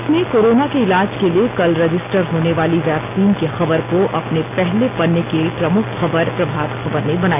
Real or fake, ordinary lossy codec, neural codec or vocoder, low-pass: real; AAC, 32 kbps; none; 3.6 kHz